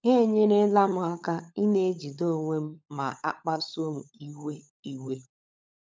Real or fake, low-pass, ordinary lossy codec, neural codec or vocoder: fake; none; none; codec, 16 kHz, 16 kbps, FunCodec, trained on LibriTTS, 50 frames a second